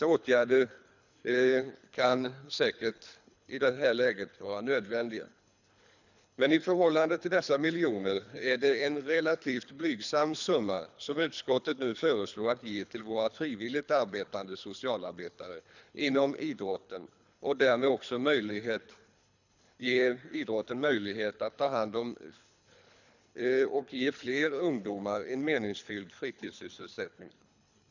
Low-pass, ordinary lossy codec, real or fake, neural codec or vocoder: 7.2 kHz; none; fake; codec, 24 kHz, 3 kbps, HILCodec